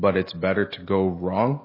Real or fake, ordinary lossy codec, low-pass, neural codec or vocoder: real; MP3, 24 kbps; 5.4 kHz; none